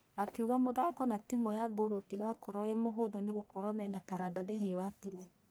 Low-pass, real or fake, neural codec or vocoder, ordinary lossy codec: none; fake; codec, 44.1 kHz, 1.7 kbps, Pupu-Codec; none